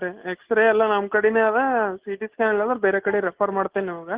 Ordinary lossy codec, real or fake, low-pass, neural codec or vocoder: Opus, 16 kbps; real; 3.6 kHz; none